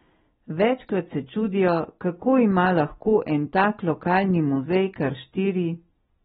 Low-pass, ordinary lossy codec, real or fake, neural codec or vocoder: 19.8 kHz; AAC, 16 kbps; real; none